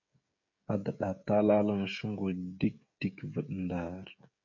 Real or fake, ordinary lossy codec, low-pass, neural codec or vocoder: fake; MP3, 48 kbps; 7.2 kHz; codec, 16 kHz, 16 kbps, FreqCodec, smaller model